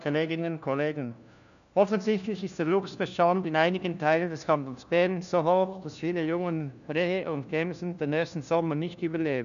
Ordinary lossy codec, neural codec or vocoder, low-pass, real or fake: none; codec, 16 kHz, 1 kbps, FunCodec, trained on LibriTTS, 50 frames a second; 7.2 kHz; fake